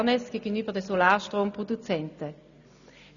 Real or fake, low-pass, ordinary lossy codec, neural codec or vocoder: real; 7.2 kHz; none; none